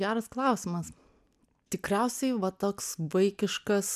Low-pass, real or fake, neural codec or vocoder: 14.4 kHz; real; none